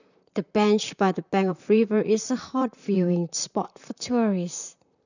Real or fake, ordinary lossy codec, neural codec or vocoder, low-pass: fake; none; vocoder, 44.1 kHz, 128 mel bands, Pupu-Vocoder; 7.2 kHz